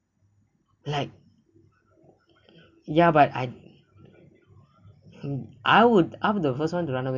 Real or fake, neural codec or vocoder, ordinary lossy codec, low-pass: real; none; none; 7.2 kHz